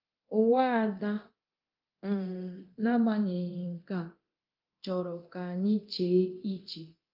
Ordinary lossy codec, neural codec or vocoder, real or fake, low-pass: Opus, 24 kbps; codec, 24 kHz, 0.9 kbps, DualCodec; fake; 5.4 kHz